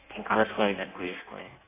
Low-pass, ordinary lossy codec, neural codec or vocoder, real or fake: 3.6 kHz; AAC, 16 kbps; codec, 16 kHz in and 24 kHz out, 0.6 kbps, FireRedTTS-2 codec; fake